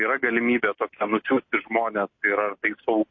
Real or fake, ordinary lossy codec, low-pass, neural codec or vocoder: real; MP3, 32 kbps; 7.2 kHz; none